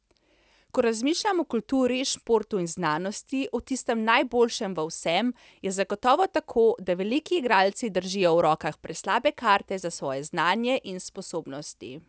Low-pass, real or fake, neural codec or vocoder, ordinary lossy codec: none; real; none; none